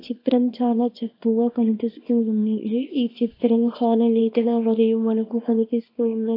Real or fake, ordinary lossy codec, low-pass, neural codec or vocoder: fake; AAC, 32 kbps; 5.4 kHz; codec, 24 kHz, 0.9 kbps, WavTokenizer, small release